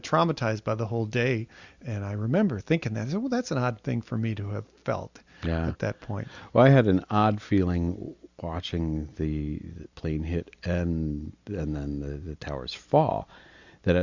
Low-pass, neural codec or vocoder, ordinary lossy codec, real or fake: 7.2 kHz; none; Opus, 64 kbps; real